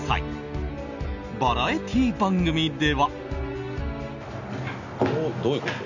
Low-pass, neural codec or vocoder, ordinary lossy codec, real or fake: 7.2 kHz; none; none; real